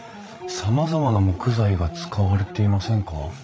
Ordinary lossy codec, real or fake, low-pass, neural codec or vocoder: none; fake; none; codec, 16 kHz, 16 kbps, FreqCodec, larger model